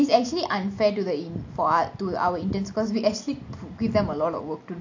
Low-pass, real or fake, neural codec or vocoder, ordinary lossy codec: 7.2 kHz; real; none; AAC, 48 kbps